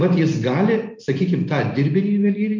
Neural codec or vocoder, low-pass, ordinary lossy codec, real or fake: none; 7.2 kHz; MP3, 64 kbps; real